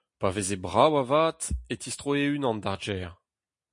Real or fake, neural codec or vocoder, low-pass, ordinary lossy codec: real; none; 10.8 kHz; MP3, 48 kbps